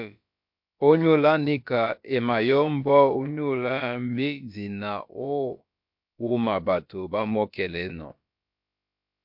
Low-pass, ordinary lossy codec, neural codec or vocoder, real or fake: 5.4 kHz; MP3, 48 kbps; codec, 16 kHz, about 1 kbps, DyCAST, with the encoder's durations; fake